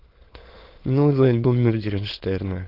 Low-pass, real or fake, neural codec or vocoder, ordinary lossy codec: 5.4 kHz; fake; autoencoder, 22.05 kHz, a latent of 192 numbers a frame, VITS, trained on many speakers; Opus, 16 kbps